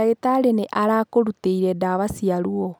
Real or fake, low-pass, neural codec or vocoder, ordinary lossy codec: real; none; none; none